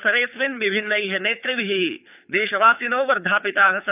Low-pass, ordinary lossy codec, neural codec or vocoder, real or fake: 3.6 kHz; none; codec, 24 kHz, 6 kbps, HILCodec; fake